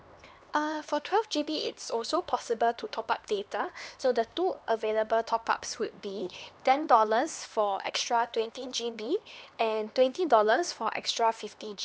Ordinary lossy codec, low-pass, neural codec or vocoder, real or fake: none; none; codec, 16 kHz, 2 kbps, X-Codec, HuBERT features, trained on LibriSpeech; fake